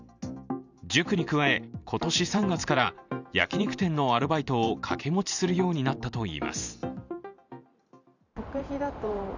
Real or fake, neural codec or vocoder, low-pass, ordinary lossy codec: fake; vocoder, 44.1 kHz, 128 mel bands every 512 samples, BigVGAN v2; 7.2 kHz; none